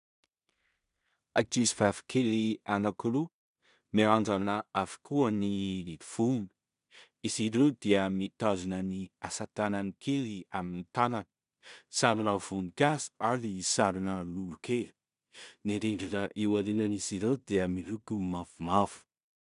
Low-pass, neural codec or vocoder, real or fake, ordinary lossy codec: 10.8 kHz; codec, 16 kHz in and 24 kHz out, 0.4 kbps, LongCat-Audio-Codec, two codebook decoder; fake; MP3, 96 kbps